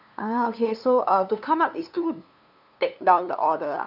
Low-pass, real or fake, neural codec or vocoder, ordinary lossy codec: 5.4 kHz; fake; codec, 16 kHz, 2 kbps, FunCodec, trained on LibriTTS, 25 frames a second; none